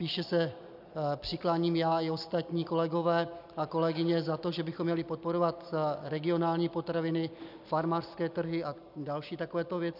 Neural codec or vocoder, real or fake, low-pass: none; real; 5.4 kHz